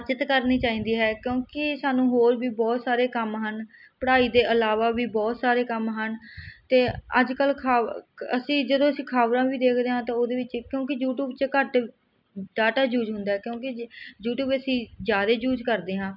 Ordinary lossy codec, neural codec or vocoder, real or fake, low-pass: none; none; real; 5.4 kHz